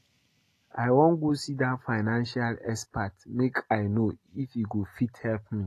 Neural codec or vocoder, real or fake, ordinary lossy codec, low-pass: none; real; AAC, 48 kbps; 14.4 kHz